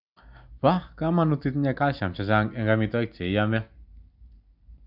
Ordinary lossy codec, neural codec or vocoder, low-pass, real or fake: none; none; 5.4 kHz; real